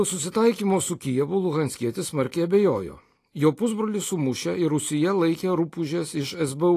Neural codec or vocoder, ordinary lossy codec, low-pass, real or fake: none; AAC, 48 kbps; 14.4 kHz; real